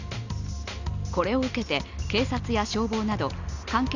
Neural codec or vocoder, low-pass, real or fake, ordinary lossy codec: none; 7.2 kHz; real; none